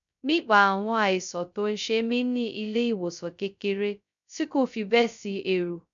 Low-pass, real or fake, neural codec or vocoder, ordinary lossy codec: 7.2 kHz; fake; codec, 16 kHz, 0.2 kbps, FocalCodec; none